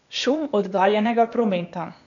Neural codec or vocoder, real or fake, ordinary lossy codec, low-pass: codec, 16 kHz, 0.8 kbps, ZipCodec; fake; none; 7.2 kHz